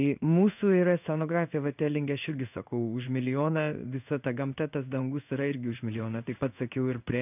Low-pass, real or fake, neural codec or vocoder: 3.6 kHz; fake; codec, 16 kHz in and 24 kHz out, 1 kbps, XY-Tokenizer